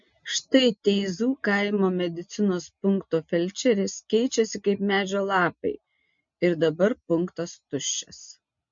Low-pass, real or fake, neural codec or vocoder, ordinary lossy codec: 7.2 kHz; real; none; MP3, 48 kbps